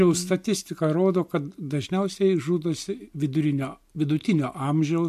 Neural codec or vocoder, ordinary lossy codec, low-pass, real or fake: none; MP3, 64 kbps; 14.4 kHz; real